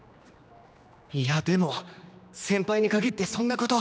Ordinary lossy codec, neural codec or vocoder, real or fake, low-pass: none; codec, 16 kHz, 2 kbps, X-Codec, HuBERT features, trained on general audio; fake; none